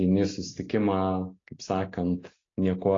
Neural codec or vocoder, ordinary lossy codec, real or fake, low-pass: none; AAC, 32 kbps; real; 7.2 kHz